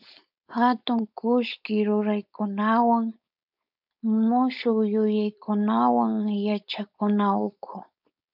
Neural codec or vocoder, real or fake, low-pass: codec, 16 kHz, 4.8 kbps, FACodec; fake; 5.4 kHz